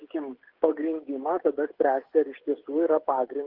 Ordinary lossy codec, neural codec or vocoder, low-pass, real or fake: Opus, 16 kbps; codec, 16 kHz, 16 kbps, FreqCodec, smaller model; 5.4 kHz; fake